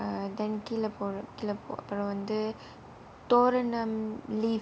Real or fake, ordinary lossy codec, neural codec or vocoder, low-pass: real; none; none; none